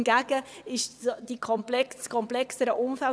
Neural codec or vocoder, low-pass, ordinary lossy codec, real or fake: vocoder, 22.05 kHz, 80 mel bands, WaveNeXt; none; none; fake